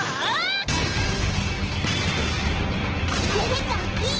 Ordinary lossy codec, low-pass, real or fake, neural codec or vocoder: Opus, 16 kbps; 7.2 kHz; real; none